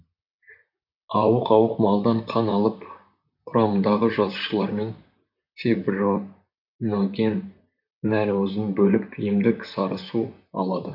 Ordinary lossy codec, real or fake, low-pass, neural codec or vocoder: none; fake; 5.4 kHz; vocoder, 44.1 kHz, 128 mel bands, Pupu-Vocoder